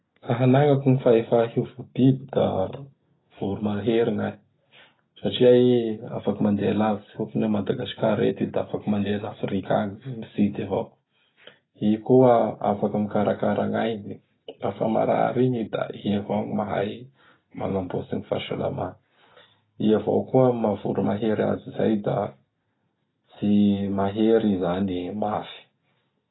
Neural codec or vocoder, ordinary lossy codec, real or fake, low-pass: none; AAC, 16 kbps; real; 7.2 kHz